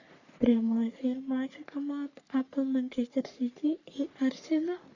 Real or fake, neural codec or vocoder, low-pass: fake; codec, 44.1 kHz, 3.4 kbps, Pupu-Codec; 7.2 kHz